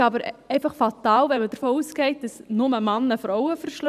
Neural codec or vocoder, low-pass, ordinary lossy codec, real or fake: vocoder, 44.1 kHz, 128 mel bands every 512 samples, BigVGAN v2; 14.4 kHz; none; fake